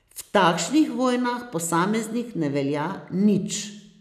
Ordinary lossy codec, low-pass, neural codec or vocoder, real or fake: none; 14.4 kHz; none; real